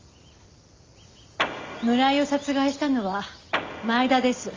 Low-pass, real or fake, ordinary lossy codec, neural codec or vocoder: 7.2 kHz; real; Opus, 32 kbps; none